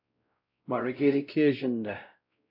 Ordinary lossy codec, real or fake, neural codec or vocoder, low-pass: AAC, 48 kbps; fake; codec, 16 kHz, 0.5 kbps, X-Codec, WavLM features, trained on Multilingual LibriSpeech; 5.4 kHz